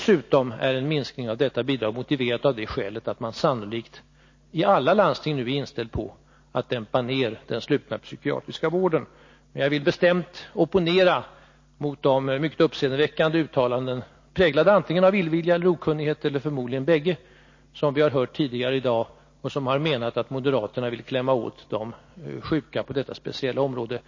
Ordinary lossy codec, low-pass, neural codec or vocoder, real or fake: MP3, 32 kbps; 7.2 kHz; none; real